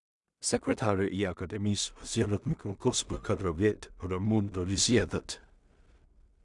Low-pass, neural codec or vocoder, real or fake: 10.8 kHz; codec, 16 kHz in and 24 kHz out, 0.4 kbps, LongCat-Audio-Codec, two codebook decoder; fake